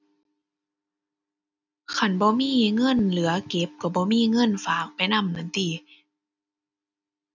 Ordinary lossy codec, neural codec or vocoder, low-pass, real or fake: none; none; 7.2 kHz; real